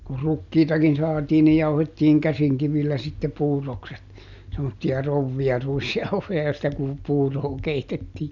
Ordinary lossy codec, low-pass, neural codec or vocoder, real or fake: none; 7.2 kHz; none; real